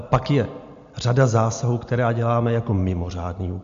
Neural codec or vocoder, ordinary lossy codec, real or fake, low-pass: none; MP3, 48 kbps; real; 7.2 kHz